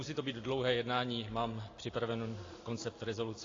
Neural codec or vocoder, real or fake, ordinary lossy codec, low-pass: none; real; AAC, 32 kbps; 7.2 kHz